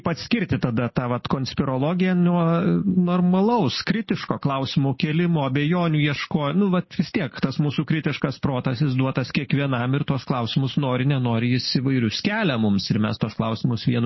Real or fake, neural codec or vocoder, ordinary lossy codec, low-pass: real; none; MP3, 24 kbps; 7.2 kHz